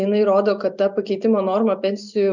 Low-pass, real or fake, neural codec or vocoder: 7.2 kHz; real; none